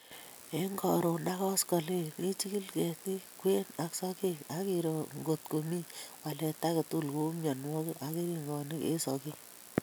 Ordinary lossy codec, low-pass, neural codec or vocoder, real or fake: none; none; none; real